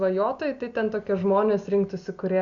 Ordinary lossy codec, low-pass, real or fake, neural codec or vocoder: Opus, 64 kbps; 7.2 kHz; real; none